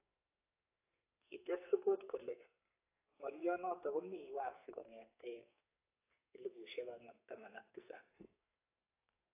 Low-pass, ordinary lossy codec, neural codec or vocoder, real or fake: 3.6 kHz; AAC, 24 kbps; codec, 44.1 kHz, 2.6 kbps, SNAC; fake